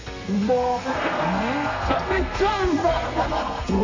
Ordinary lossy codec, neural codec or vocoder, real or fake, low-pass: MP3, 64 kbps; codec, 32 kHz, 1.9 kbps, SNAC; fake; 7.2 kHz